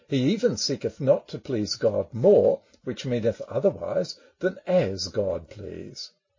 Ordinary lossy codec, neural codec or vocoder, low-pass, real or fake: MP3, 32 kbps; none; 7.2 kHz; real